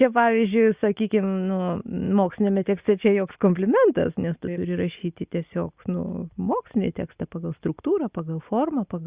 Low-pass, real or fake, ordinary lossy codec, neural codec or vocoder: 3.6 kHz; real; Opus, 64 kbps; none